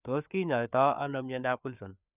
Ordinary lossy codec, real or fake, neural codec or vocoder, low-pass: none; fake; codec, 16 kHz, 4 kbps, FreqCodec, larger model; 3.6 kHz